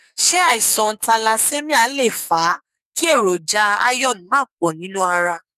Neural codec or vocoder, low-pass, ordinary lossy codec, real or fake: codec, 44.1 kHz, 2.6 kbps, SNAC; 14.4 kHz; none; fake